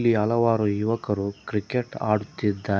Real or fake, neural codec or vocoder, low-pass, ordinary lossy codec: real; none; none; none